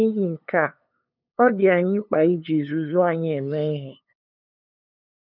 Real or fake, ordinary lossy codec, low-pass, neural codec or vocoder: fake; none; 5.4 kHz; codec, 16 kHz, 2 kbps, FunCodec, trained on LibriTTS, 25 frames a second